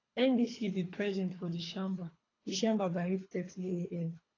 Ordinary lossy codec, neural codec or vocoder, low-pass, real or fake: AAC, 32 kbps; codec, 24 kHz, 3 kbps, HILCodec; 7.2 kHz; fake